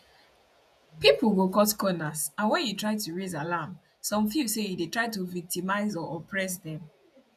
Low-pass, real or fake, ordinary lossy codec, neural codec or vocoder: 14.4 kHz; fake; none; vocoder, 48 kHz, 128 mel bands, Vocos